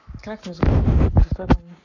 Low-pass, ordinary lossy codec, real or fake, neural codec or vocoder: 7.2 kHz; none; fake; codec, 44.1 kHz, 7.8 kbps, Pupu-Codec